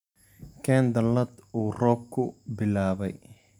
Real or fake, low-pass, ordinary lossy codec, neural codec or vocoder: real; 19.8 kHz; none; none